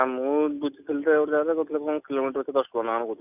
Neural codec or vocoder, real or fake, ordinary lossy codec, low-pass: none; real; none; 3.6 kHz